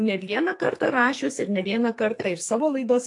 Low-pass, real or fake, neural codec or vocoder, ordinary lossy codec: 10.8 kHz; fake; codec, 44.1 kHz, 2.6 kbps, SNAC; AAC, 48 kbps